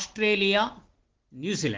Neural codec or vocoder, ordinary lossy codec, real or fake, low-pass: none; Opus, 16 kbps; real; 7.2 kHz